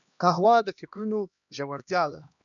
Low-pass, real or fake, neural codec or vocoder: 7.2 kHz; fake; codec, 16 kHz, 1 kbps, X-Codec, HuBERT features, trained on LibriSpeech